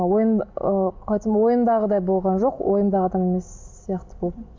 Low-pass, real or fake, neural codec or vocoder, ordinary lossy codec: 7.2 kHz; real; none; MP3, 64 kbps